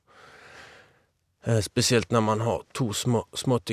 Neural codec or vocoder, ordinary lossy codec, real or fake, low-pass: none; none; real; 14.4 kHz